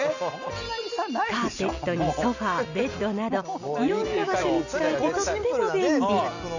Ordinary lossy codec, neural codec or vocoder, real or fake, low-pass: none; none; real; 7.2 kHz